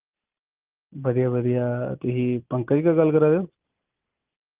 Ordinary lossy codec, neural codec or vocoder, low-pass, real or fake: Opus, 32 kbps; none; 3.6 kHz; real